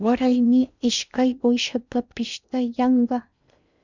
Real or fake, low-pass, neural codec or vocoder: fake; 7.2 kHz; codec, 16 kHz in and 24 kHz out, 0.6 kbps, FocalCodec, streaming, 2048 codes